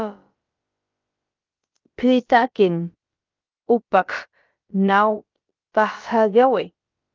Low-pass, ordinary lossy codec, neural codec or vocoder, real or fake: 7.2 kHz; Opus, 32 kbps; codec, 16 kHz, about 1 kbps, DyCAST, with the encoder's durations; fake